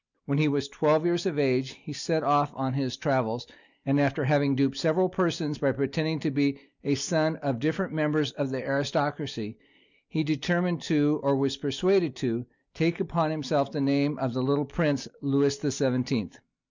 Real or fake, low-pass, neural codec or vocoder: real; 7.2 kHz; none